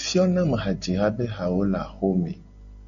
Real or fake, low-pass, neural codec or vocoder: real; 7.2 kHz; none